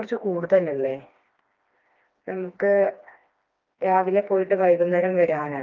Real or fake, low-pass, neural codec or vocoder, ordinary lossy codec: fake; 7.2 kHz; codec, 16 kHz, 2 kbps, FreqCodec, smaller model; Opus, 32 kbps